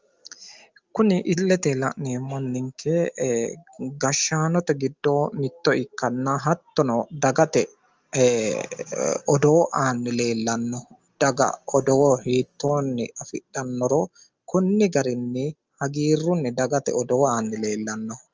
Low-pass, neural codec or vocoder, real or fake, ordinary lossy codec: 7.2 kHz; none; real; Opus, 24 kbps